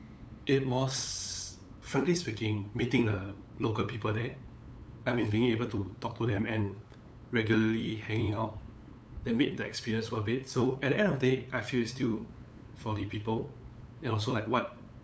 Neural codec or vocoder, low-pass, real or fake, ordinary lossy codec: codec, 16 kHz, 8 kbps, FunCodec, trained on LibriTTS, 25 frames a second; none; fake; none